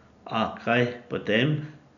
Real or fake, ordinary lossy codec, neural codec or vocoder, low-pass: real; none; none; 7.2 kHz